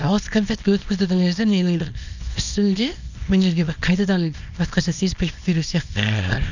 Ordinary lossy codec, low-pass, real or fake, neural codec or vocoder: none; 7.2 kHz; fake; codec, 24 kHz, 0.9 kbps, WavTokenizer, small release